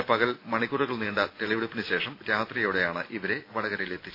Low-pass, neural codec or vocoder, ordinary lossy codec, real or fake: 5.4 kHz; none; AAC, 32 kbps; real